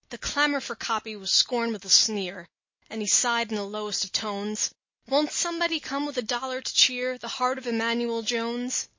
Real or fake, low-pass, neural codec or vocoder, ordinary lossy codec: real; 7.2 kHz; none; MP3, 32 kbps